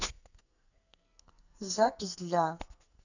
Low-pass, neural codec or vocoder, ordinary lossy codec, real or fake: 7.2 kHz; codec, 44.1 kHz, 2.6 kbps, SNAC; none; fake